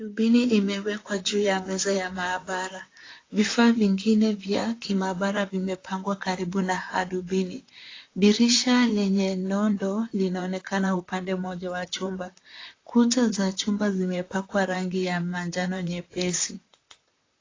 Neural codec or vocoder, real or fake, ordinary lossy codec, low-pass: vocoder, 44.1 kHz, 128 mel bands, Pupu-Vocoder; fake; AAC, 32 kbps; 7.2 kHz